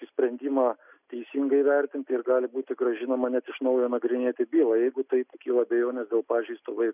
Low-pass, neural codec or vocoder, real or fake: 3.6 kHz; none; real